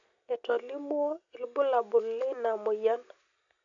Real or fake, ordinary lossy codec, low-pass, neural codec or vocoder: real; none; 7.2 kHz; none